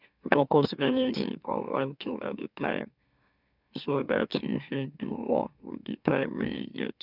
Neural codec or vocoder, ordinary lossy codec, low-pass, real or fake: autoencoder, 44.1 kHz, a latent of 192 numbers a frame, MeloTTS; none; 5.4 kHz; fake